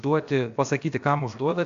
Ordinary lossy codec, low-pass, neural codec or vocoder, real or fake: AAC, 64 kbps; 7.2 kHz; codec, 16 kHz, about 1 kbps, DyCAST, with the encoder's durations; fake